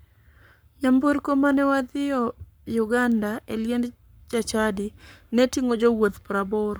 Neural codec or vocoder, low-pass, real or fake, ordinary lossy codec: codec, 44.1 kHz, 7.8 kbps, Pupu-Codec; none; fake; none